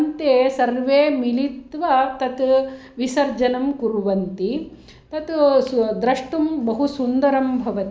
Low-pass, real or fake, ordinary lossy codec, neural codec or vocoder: none; real; none; none